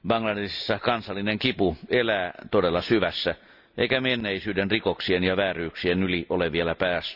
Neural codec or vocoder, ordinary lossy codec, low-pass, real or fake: none; none; 5.4 kHz; real